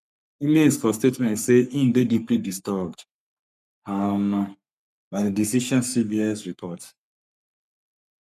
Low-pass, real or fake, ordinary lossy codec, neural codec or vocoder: 14.4 kHz; fake; none; codec, 44.1 kHz, 3.4 kbps, Pupu-Codec